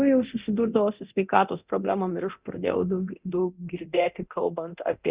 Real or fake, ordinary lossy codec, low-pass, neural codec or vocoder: fake; Opus, 64 kbps; 3.6 kHz; codec, 24 kHz, 0.9 kbps, DualCodec